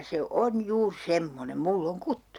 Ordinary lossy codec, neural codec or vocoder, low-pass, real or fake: none; none; 19.8 kHz; real